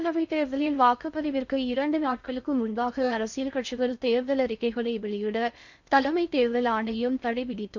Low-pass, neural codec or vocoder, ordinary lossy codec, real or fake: 7.2 kHz; codec, 16 kHz in and 24 kHz out, 0.6 kbps, FocalCodec, streaming, 2048 codes; none; fake